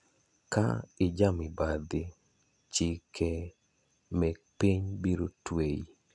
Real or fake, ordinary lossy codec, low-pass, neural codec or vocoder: real; none; 10.8 kHz; none